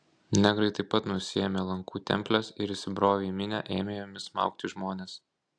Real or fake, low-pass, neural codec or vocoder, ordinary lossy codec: real; 9.9 kHz; none; AAC, 64 kbps